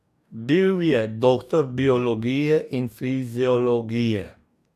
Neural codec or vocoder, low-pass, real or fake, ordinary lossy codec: codec, 44.1 kHz, 2.6 kbps, DAC; 14.4 kHz; fake; none